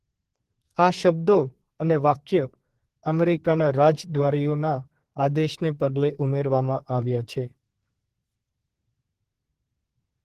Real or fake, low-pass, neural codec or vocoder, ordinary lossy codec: fake; 14.4 kHz; codec, 32 kHz, 1.9 kbps, SNAC; Opus, 16 kbps